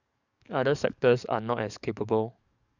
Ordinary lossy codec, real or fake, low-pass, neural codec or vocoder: none; fake; 7.2 kHz; codec, 44.1 kHz, 7.8 kbps, DAC